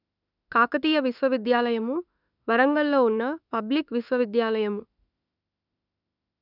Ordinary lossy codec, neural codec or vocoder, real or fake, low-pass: none; autoencoder, 48 kHz, 32 numbers a frame, DAC-VAE, trained on Japanese speech; fake; 5.4 kHz